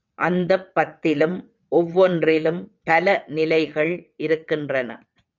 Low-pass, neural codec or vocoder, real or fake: 7.2 kHz; vocoder, 22.05 kHz, 80 mel bands, WaveNeXt; fake